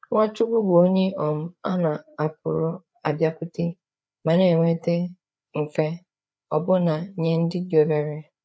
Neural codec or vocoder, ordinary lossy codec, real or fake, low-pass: codec, 16 kHz, 4 kbps, FreqCodec, larger model; none; fake; none